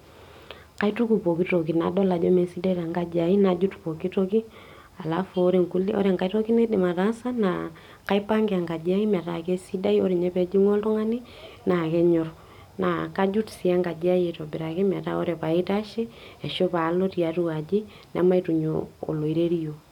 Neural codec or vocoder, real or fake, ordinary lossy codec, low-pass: none; real; none; 19.8 kHz